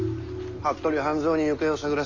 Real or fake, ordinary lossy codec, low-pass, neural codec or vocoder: real; none; 7.2 kHz; none